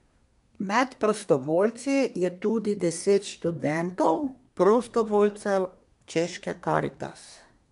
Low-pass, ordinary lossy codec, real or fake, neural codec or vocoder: 10.8 kHz; none; fake; codec, 24 kHz, 1 kbps, SNAC